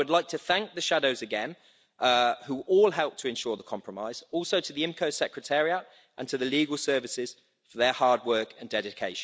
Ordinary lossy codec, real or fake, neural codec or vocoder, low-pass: none; real; none; none